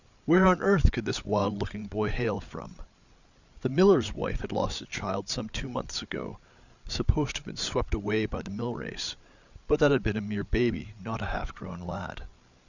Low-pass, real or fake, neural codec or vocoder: 7.2 kHz; fake; codec, 16 kHz, 8 kbps, FreqCodec, larger model